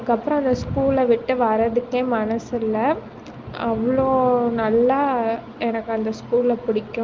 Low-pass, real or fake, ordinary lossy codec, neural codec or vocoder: 7.2 kHz; real; Opus, 32 kbps; none